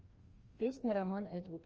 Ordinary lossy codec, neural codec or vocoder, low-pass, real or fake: Opus, 24 kbps; codec, 16 kHz, 1 kbps, FreqCodec, larger model; 7.2 kHz; fake